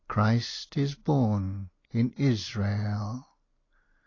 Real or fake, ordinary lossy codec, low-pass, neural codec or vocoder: real; AAC, 32 kbps; 7.2 kHz; none